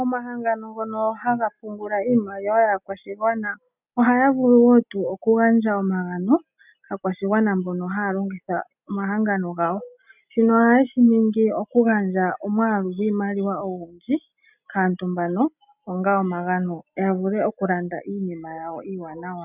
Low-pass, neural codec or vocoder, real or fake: 3.6 kHz; none; real